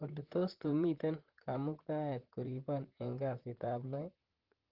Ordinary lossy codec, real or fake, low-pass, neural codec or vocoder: Opus, 16 kbps; fake; 5.4 kHz; vocoder, 44.1 kHz, 128 mel bands, Pupu-Vocoder